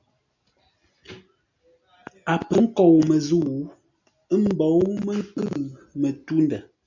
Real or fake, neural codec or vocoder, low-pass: real; none; 7.2 kHz